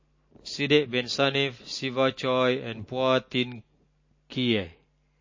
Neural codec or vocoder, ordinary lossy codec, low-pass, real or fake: none; MP3, 32 kbps; 7.2 kHz; real